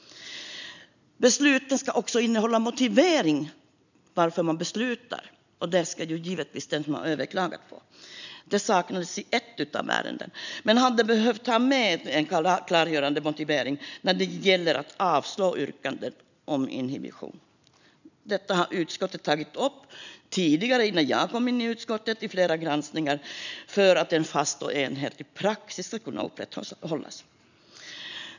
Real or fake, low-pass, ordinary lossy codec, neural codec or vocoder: real; 7.2 kHz; none; none